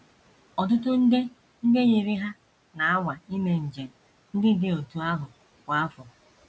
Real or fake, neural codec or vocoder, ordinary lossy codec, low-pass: real; none; none; none